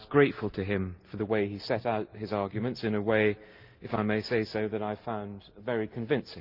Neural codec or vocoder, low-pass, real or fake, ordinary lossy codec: none; 5.4 kHz; real; Opus, 32 kbps